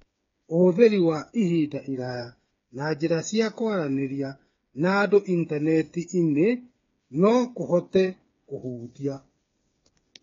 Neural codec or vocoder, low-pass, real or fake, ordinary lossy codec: codec, 16 kHz, 8 kbps, FreqCodec, smaller model; 7.2 kHz; fake; AAC, 32 kbps